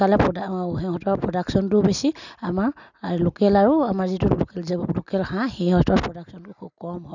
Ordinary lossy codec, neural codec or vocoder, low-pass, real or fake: none; none; 7.2 kHz; real